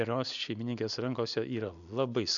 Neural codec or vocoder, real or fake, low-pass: none; real; 7.2 kHz